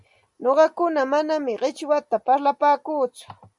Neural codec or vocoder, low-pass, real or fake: none; 10.8 kHz; real